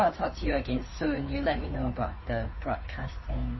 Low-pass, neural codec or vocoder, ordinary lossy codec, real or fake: 7.2 kHz; codec, 16 kHz, 2 kbps, FunCodec, trained on Chinese and English, 25 frames a second; MP3, 24 kbps; fake